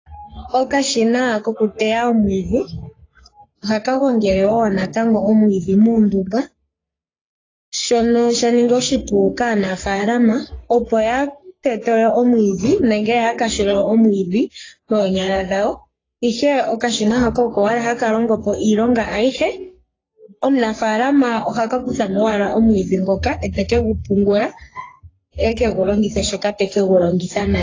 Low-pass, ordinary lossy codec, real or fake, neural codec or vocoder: 7.2 kHz; AAC, 32 kbps; fake; codec, 44.1 kHz, 3.4 kbps, Pupu-Codec